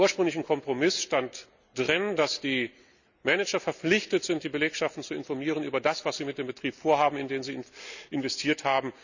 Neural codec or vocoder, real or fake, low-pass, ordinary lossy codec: none; real; 7.2 kHz; none